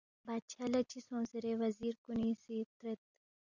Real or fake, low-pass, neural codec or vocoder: real; 7.2 kHz; none